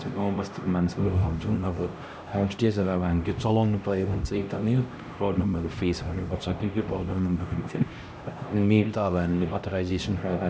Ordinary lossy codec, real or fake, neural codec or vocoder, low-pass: none; fake; codec, 16 kHz, 1 kbps, X-Codec, HuBERT features, trained on LibriSpeech; none